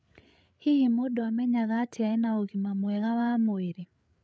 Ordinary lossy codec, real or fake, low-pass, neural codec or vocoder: none; fake; none; codec, 16 kHz, 8 kbps, FreqCodec, larger model